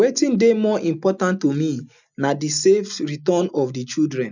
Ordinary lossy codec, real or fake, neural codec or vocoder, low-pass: none; real; none; 7.2 kHz